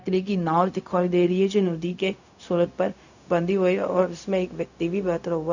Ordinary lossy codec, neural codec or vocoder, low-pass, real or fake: none; codec, 16 kHz, 0.4 kbps, LongCat-Audio-Codec; 7.2 kHz; fake